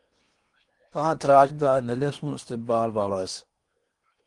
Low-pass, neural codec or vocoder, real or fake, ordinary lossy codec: 10.8 kHz; codec, 16 kHz in and 24 kHz out, 0.8 kbps, FocalCodec, streaming, 65536 codes; fake; Opus, 24 kbps